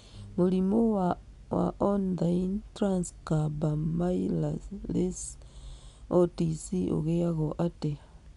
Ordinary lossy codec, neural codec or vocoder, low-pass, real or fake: none; none; 10.8 kHz; real